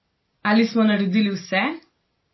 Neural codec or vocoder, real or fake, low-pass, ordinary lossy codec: none; real; 7.2 kHz; MP3, 24 kbps